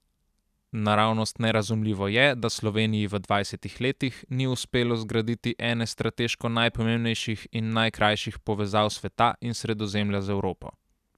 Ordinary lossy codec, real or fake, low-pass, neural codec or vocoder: none; real; 14.4 kHz; none